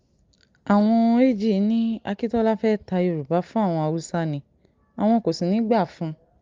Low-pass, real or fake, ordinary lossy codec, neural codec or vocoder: 7.2 kHz; real; Opus, 32 kbps; none